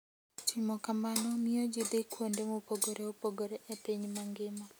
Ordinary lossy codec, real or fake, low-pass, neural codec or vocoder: none; real; none; none